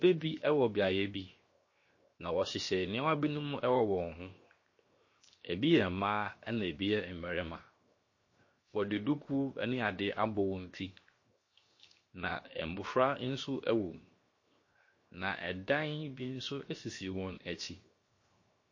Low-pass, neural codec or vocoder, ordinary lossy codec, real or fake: 7.2 kHz; codec, 16 kHz, 0.7 kbps, FocalCodec; MP3, 32 kbps; fake